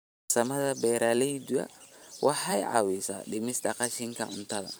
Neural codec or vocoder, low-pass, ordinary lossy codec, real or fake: vocoder, 44.1 kHz, 128 mel bands every 512 samples, BigVGAN v2; none; none; fake